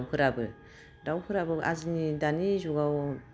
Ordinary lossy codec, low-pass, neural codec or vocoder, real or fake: none; none; none; real